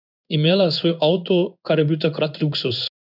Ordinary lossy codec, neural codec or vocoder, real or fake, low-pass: none; codec, 16 kHz in and 24 kHz out, 1 kbps, XY-Tokenizer; fake; 5.4 kHz